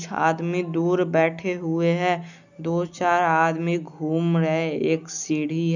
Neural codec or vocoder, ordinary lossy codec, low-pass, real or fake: none; none; 7.2 kHz; real